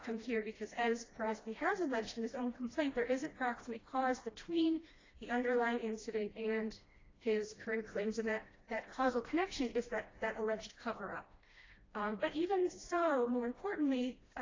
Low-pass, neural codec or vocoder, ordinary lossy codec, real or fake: 7.2 kHz; codec, 16 kHz, 1 kbps, FreqCodec, smaller model; AAC, 32 kbps; fake